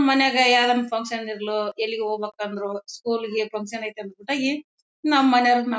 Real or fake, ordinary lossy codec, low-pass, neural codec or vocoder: real; none; none; none